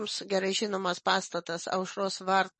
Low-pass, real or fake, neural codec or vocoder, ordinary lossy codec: 10.8 kHz; real; none; MP3, 32 kbps